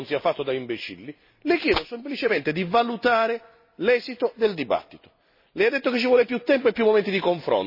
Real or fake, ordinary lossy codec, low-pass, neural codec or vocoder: real; MP3, 24 kbps; 5.4 kHz; none